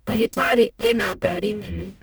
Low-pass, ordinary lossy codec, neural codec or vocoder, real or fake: none; none; codec, 44.1 kHz, 0.9 kbps, DAC; fake